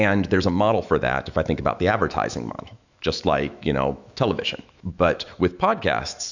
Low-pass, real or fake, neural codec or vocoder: 7.2 kHz; fake; autoencoder, 48 kHz, 128 numbers a frame, DAC-VAE, trained on Japanese speech